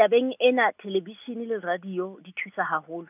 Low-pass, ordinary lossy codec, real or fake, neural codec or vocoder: 3.6 kHz; none; real; none